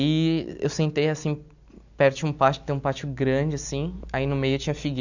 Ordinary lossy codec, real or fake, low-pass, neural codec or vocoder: none; real; 7.2 kHz; none